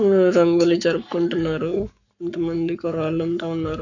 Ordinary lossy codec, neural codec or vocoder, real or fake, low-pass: none; codec, 44.1 kHz, 7.8 kbps, Pupu-Codec; fake; 7.2 kHz